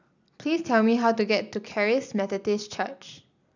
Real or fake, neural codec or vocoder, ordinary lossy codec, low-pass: real; none; none; 7.2 kHz